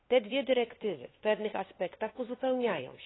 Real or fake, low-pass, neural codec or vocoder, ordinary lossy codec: fake; 7.2 kHz; codec, 16 kHz, 2 kbps, FunCodec, trained on LibriTTS, 25 frames a second; AAC, 16 kbps